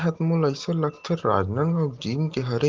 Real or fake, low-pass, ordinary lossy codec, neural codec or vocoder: real; 7.2 kHz; Opus, 16 kbps; none